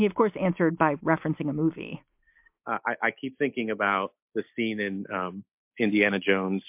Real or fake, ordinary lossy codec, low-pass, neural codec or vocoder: real; MP3, 32 kbps; 3.6 kHz; none